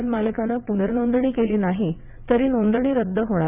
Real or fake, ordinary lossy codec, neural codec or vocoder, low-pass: fake; none; vocoder, 22.05 kHz, 80 mel bands, WaveNeXt; 3.6 kHz